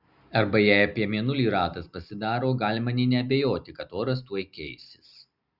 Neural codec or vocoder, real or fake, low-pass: none; real; 5.4 kHz